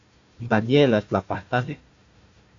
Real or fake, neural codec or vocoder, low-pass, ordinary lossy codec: fake; codec, 16 kHz, 1 kbps, FunCodec, trained on Chinese and English, 50 frames a second; 7.2 kHz; AAC, 48 kbps